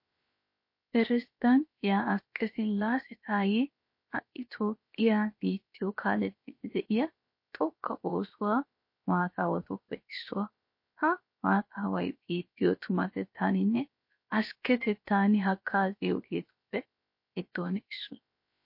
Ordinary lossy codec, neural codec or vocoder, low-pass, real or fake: MP3, 32 kbps; codec, 16 kHz, 0.7 kbps, FocalCodec; 5.4 kHz; fake